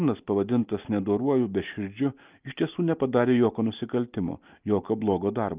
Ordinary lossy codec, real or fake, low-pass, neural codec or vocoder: Opus, 24 kbps; real; 3.6 kHz; none